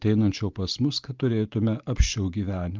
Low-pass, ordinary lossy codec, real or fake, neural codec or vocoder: 7.2 kHz; Opus, 24 kbps; real; none